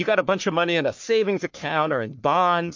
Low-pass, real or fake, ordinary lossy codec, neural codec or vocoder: 7.2 kHz; fake; MP3, 48 kbps; codec, 44.1 kHz, 3.4 kbps, Pupu-Codec